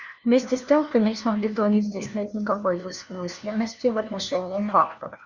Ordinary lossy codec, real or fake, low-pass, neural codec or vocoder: Opus, 64 kbps; fake; 7.2 kHz; codec, 16 kHz, 1 kbps, FunCodec, trained on LibriTTS, 50 frames a second